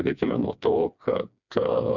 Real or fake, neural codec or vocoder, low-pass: fake; codec, 16 kHz, 2 kbps, FreqCodec, smaller model; 7.2 kHz